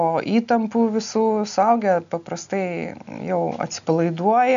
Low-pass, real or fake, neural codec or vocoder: 7.2 kHz; real; none